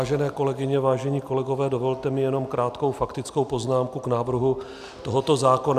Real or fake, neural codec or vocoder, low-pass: real; none; 14.4 kHz